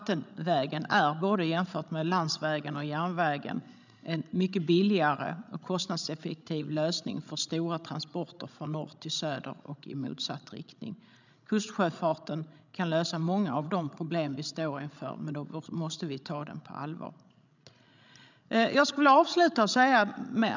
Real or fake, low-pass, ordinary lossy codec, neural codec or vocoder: fake; 7.2 kHz; none; codec, 16 kHz, 16 kbps, FreqCodec, larger model